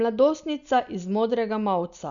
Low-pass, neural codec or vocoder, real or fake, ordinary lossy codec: 7.2 kHz; none; real; none